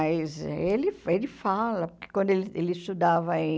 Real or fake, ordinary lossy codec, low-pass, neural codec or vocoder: real; none; none; none